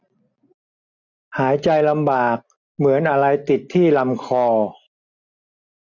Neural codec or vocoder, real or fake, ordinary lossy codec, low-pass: none; real; none; 7.2 kHz